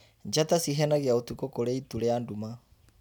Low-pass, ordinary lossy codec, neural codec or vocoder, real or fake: none; none; none; real